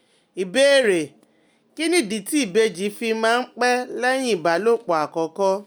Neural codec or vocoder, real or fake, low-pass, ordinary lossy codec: none; real; none; none